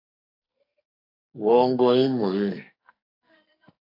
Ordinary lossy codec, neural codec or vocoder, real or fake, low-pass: AAC, 48 kbps; codec, 44.1 kHz, 2.6 kbps, SNAC; fake; 5.4 kHz